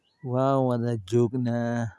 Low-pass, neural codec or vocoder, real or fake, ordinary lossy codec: none; none; real; none